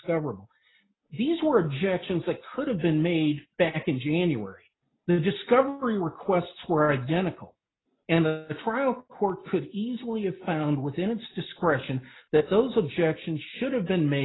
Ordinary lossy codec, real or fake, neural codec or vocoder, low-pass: AAC, 16 kbps; real; none; 7.2 kHz